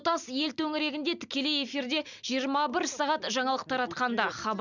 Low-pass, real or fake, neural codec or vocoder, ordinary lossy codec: 7.2 kHz; real; none; none